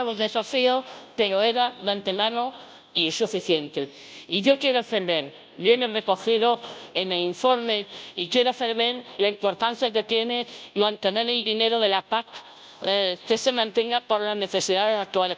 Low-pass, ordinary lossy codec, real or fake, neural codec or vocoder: none; none; fake; codec, 16 kHz, 0.5 kbps, FunCodec, trained on Chinese and English, 25 frames a second